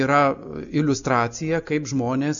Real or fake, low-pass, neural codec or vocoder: real; 7.2 kHz; none